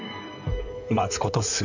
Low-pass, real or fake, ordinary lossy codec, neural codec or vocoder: 7.2 kHz; fake; none; codec, 16 kHz in and 24 kHz out, 2.2 kbps, FireRedTTS-2 codec